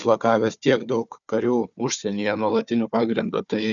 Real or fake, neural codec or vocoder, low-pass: fake; codec, 16 kHz, 4 kbps, FunCodec, trained on Chinese and English, 50 frames a second; 7.2 kHz